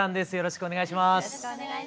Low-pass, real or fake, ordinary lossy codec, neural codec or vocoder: none; real; none; none